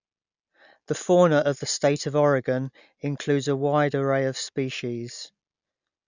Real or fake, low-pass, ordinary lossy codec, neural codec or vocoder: real; 7.2 kHz; none; none